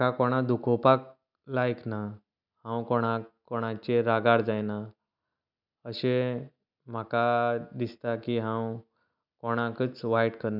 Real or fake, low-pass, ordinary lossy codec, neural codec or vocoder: real; 5.4 kHz; none; none